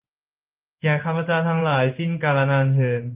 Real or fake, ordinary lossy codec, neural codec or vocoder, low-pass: fake; Opus, 32 kbps; codec, 16 kHz in and 24 kHz out, 1 kbps, XY-Tokenizer; 3.6 kHz